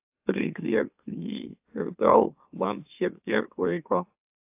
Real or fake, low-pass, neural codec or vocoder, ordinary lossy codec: fake; 3.6 kHz; autoencoder, 44.1 kHz, a latent of 192 numbers a frame, MeloTTS; AAC, 32 kbps